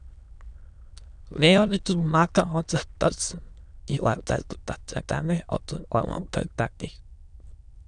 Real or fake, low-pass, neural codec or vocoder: fake; 9.9 kHz; autoencoder, 22.05 kHz, a latent of 192 numbers a frame, VITS, trained on many speakers